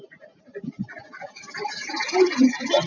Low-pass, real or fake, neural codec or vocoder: 7.2 kHz; real; none